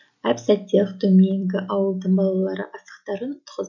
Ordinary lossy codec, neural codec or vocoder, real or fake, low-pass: none; none; real; 7.2 kHz